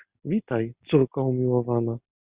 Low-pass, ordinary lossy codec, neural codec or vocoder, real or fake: 3.6 kHz; Opus, 64 kbps; none; real